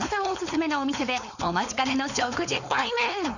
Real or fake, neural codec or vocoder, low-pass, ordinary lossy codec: fake; codec, 16 kHz, 4.8 kbps, FACodec; 7.2 kHz; none